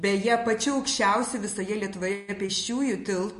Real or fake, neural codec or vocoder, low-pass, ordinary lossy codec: real; none; 14.4 kHz; MP3, 48 kbps